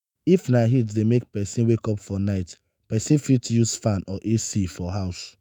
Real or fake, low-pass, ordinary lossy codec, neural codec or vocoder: fake; none; none; autoencoder, 48 kHz, 128 numbers a frame, DAC-VAE, trained on Japanese speech